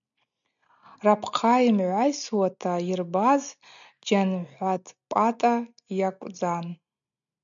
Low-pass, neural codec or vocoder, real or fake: 7.2 kHz; none; real